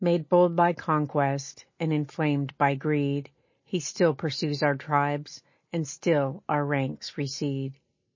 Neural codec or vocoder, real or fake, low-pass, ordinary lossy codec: none; real; 7.2 kHz; MP3, 32 kbps